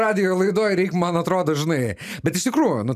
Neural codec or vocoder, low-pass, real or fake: none; 14.4 kHz; real